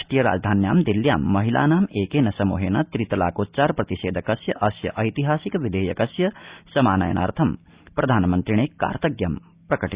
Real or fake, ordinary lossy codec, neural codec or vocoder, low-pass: real; Opus, 64 kbps; none; 3.6 kHz